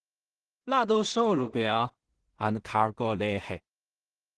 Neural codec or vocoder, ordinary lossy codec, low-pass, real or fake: codec, 16 kHz in and 24 kHz out, 0.4 kbps, LongCat-Audio-Codec, two codebook decoder; Opus, 16 kbps; 10.8 kHz; fake